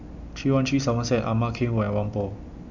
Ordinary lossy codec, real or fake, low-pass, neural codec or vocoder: none; real; 7.2 kHz; none